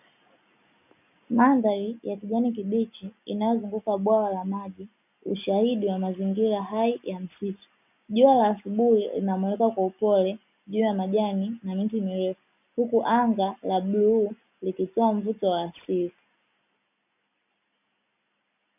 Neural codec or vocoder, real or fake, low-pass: none; real; 3.6 kHz